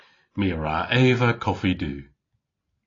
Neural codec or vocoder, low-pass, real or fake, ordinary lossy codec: none; 7.2 kHz; real; AAC, 32 kbps